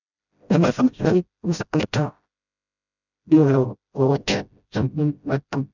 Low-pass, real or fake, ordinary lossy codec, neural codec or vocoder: 7.2 kHz; fake; none; codec, 16 kHz, 0.5 kbps, FreqCodec, smaller model